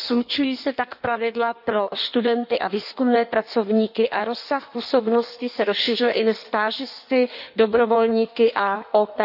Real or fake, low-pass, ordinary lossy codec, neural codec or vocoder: fake; 5.4 kHz; none; codec, 16 kHz in and 24 kHz out, 1.1 kbps, FireRedTTS-2 codec